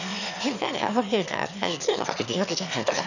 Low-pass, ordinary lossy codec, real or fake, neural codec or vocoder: 7.2 kHz; none; fake; autoencoder, 22.05 kHz, a latent of 192 numbers a frame, VITS, trained on one speaker